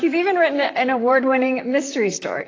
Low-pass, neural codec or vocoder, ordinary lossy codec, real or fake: 7.2 kHz; vocoder, 44.1 kHz, 128 mel bands, Pupu-Vocoder; AAC, 32 kbps; fake